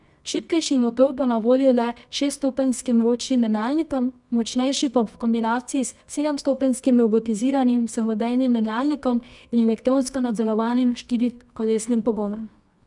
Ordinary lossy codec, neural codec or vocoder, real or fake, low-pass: none; codec, 24 kHz, 0.9 kbps, WavTokenizer, medium music audio release; fake; 10.8 kHz